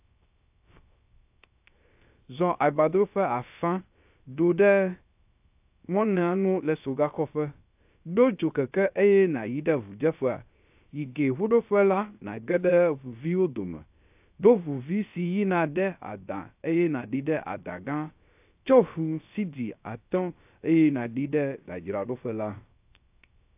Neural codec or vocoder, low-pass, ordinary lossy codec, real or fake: codec, 16 kHz, 0.3 kbps, FocalCodec; 3.6 kHz; AAC, 32 kbps; fake